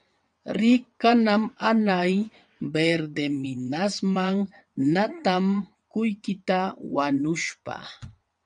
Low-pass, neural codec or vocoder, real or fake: 9.9 kHz; vocoder, 22.05 kHz, 80 mel bands, WaveNeXt; fake